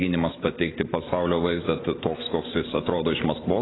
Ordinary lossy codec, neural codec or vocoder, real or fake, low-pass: AAC, 16 kbps; vocoder, 44.1 kHz, 128 mel bands every 512 samples, BigVGAN v2; fake; 7.2 kHz